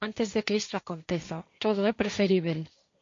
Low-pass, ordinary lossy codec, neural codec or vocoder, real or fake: 7.2 kHz; MP3, 48 kbps; codec, 16 kHz, 1.1 kbps, Voila-Tokenizer; fake